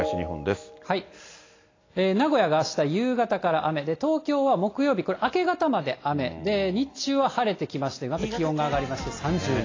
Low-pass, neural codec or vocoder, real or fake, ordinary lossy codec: 7.2 kHz; none; real; AAC, 32 kbps